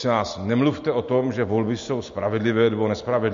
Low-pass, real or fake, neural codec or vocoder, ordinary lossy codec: 7.2 kHz; real; none; MP3, 48 kbps